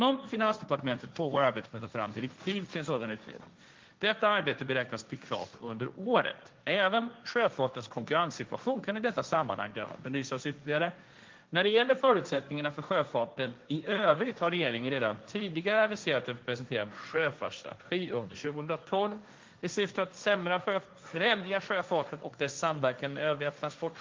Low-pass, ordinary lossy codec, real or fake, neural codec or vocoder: 7.2 kHz; Opus, 32 kbps; fake; codec, 16 kHz, 1.1 kbps, Voila-Tokenizer